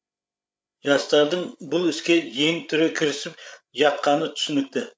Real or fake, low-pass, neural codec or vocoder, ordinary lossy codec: fake; none; codec, 16 kHz, 8 kbps, FreqCodec, larger model; none